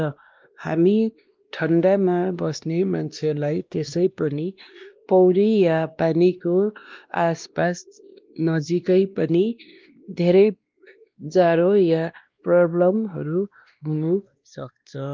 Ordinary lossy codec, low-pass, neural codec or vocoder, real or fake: Opus, 32 kbps; 7.2 kHz; codec, 16 kHz, 1 kbps, X-Codec, WavLM features, trained on Multilingual LibriSpeech; fake